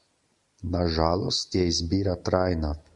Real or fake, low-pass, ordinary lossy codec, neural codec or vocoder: real; 10.8 kHz; Opus, 64 kbps; none